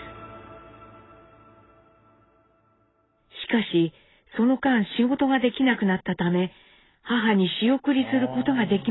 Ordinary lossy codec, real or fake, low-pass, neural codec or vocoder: AAC, 16 kbps; real; 7.2 kHz; none